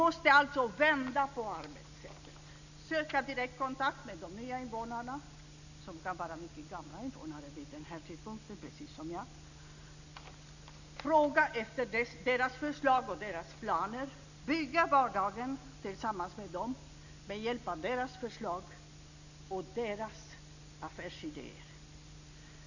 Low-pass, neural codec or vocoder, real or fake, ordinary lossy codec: 7.2 kHz; none; real; none